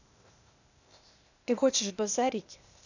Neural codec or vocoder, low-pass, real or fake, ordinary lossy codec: codec, 16 kHz, 0.8 kbps, ZipCodec; 7.2 kHz; fake; none